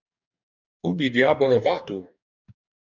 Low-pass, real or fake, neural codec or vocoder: 7.2 kHz; fake; codec, 44.1 kHz, 2.6 kbps, DAC